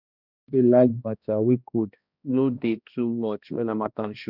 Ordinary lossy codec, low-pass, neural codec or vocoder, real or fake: none; 5.4 kHz; codec, 16 kHz, 1 kbps, X-Codec, HuBERT features, trained on balanced general audio; fake